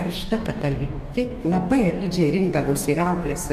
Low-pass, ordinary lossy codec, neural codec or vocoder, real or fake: 14.4 kHz; AAC, 96 kbps; codec, 44.1 kHz, 2.6 kbps, DAC; fake